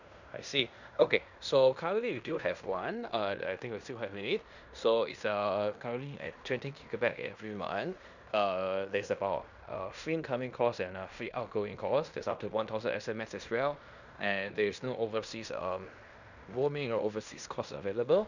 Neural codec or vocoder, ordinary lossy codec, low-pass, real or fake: codec, 16 kHz in and 24 kHz out, 0.9 kbps, LongCat-Audio-Codec, fine tuned four codebook decoder; none; 7.2 kHz; fake